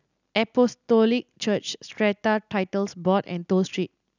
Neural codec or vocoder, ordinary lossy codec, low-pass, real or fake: none; none; 7.2 kHz; real